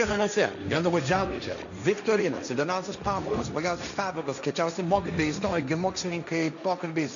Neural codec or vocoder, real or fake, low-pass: codec, 16 kHz, 1.1 kbps, Voila-Tokenizer; fake; 7.2 kHz